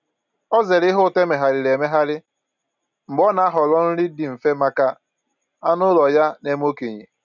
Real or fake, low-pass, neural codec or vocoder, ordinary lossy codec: real; 7.2 kHz; none; none